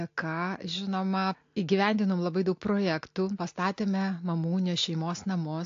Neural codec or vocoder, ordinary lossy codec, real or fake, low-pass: none; AAC, 48 kbps; real; 7.2 kHz